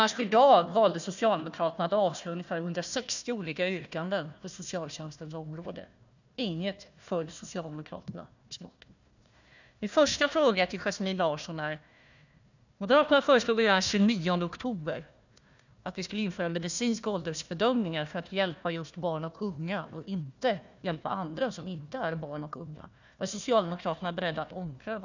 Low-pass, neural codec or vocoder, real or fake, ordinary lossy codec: 7.2 kHz; codec, 16 kHz, 1 kbps, FunCodec, trained on Chinese and English, 50 frames a second; fake; none